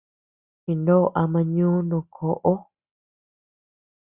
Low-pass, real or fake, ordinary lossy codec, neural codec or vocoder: 3.6 kHz; real; Opus, 64 kbps; none